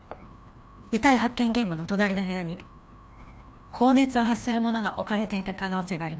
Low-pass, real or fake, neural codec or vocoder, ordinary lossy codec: none; fake; codec, 16 kHz, 1 kbps, FreqCodec, larger model; none